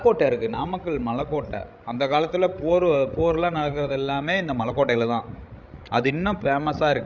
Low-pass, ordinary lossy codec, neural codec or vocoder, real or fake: 7.2 kHz; none; codec, 16 kHz, 16 kbps, FreqCodec, larger model; fake